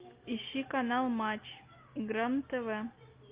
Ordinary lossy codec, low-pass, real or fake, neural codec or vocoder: Opus, 24 kbps; 3.6 kHz; real; none